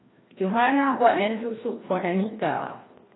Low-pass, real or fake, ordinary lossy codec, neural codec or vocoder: 7.2 kHz; fake; AAC, 16 kbps; codec, 16 kHz, 1 kbps, FreqCodec, larger model